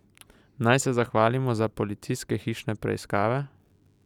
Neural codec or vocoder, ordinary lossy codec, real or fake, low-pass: none; none; real; 19.8 kHz